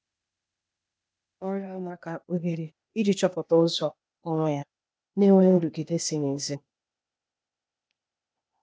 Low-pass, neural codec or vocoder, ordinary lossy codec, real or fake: none; codec, 16 kHz, 0.8 kbps, ZipCodec; none; fake